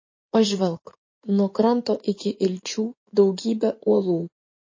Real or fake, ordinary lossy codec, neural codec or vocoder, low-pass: fake; MP3, 32 kbps; codec, 16 kHz in and 24 kHz out, 2.2 kbps, FireRedTTS-2 codec; 7.2 kHz